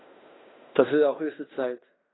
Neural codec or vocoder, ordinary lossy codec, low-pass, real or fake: codec, 16 kHz in and 24 kHz out, 0.9 kbps, LongCat-Audio-Codec, fine tuned four codebook decoder; AAC, 16 kbps; 7.2 kHz; fake